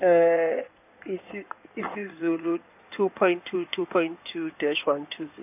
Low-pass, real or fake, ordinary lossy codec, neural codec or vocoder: 3.6 kHz; fake; none; codec, 16 kHz in and 24 kHz out, 2.2 kbps, FireRedTTS-2 codec